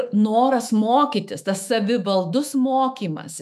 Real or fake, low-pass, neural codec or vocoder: fake; 14.4 kHz; autoencoder, 48 kHz, 128 numbers a frame, DAC-VAE, trained on Japanese speech